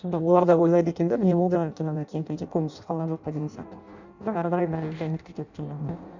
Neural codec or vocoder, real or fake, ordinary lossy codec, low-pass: codec, 16 kHz in and 24 kHz out, 0.6 kbps, FireRedTTS-2 codec; fake; none; 7.2 kHz